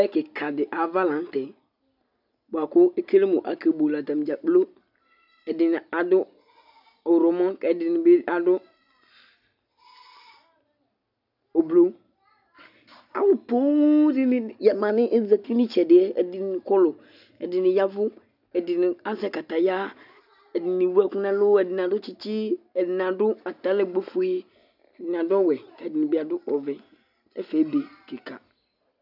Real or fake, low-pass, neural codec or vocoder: real; 5.4 kHz; none